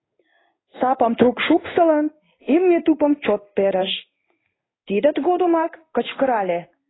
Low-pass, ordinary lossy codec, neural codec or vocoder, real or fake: 7.2 kHz; AAC, 16 kbps; codec, 16 kHz in and 24 kHz out, 1 kbps, XY-Tokenizer; fake